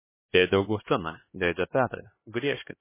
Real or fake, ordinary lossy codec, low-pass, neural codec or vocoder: fake; MP3, 16 kbps; 3.6 kHz; codec, 16 kHz, 2 kbps, X-Codec, HuBERT features, trained on LibriSpeech